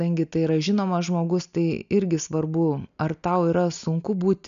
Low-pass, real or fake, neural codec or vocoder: 7.2 kHz; real; none